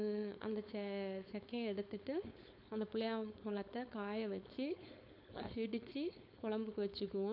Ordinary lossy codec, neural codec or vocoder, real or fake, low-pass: none; codec, 16 kHz, 4.8 kbps, FACodec; fake; 5.4 kHz